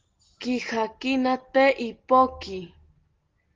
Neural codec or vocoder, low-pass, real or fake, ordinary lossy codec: none; 7.2 kHz; real; Opus, 16 kbps